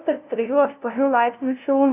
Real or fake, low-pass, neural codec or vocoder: fake; 3.6 kHz; codec, 16 kHz, 0.5 kbps, FunCodec, trained on LibriTTS, 25 frames a second